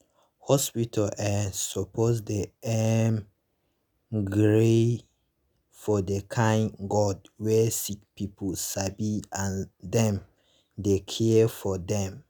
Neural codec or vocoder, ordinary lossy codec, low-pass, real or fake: none; none; none; real